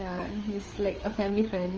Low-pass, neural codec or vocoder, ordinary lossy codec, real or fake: 7.2 kHz; codec, 16 kHz, 4 kbps, FreqCodec, larger model; Opus, 24 kbps; fake